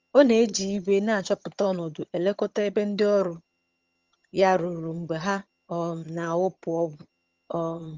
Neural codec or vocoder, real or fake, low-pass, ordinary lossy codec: vocoder, 22.05 kHz, 80 mel bands, HiFi-GAN; fake; 7.2 kHz; Opus, 32 kbps